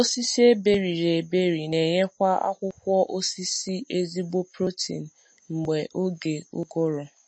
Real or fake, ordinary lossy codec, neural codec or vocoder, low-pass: real; MP3, 32 kbps; none; 9.9 kHz